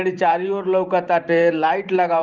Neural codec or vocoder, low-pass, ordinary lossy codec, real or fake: vocoder, 44.1 kHz, 128 mel bands every 512 samples, BigVGAN v2; 7.2 kHz; Opus, 24 kbps; fake